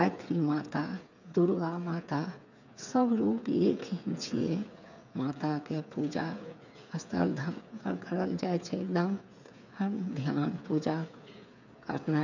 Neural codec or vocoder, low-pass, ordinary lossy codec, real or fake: codec, 16 kHz, 8 kbps, FreqCodec, smaller model; 7.2 kHz; none; fake